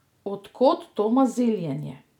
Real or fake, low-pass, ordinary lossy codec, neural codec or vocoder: real; 19.8 kHz; none; none